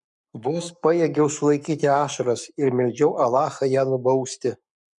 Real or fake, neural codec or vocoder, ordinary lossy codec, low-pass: fake; codec, 44.1 kHz, 7.8 kbps, Pupu-Codec; MP3, 96 kbps; 10.8 kHz